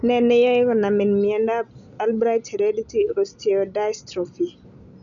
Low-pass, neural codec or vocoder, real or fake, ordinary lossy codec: 7.2 kHz; none; real; none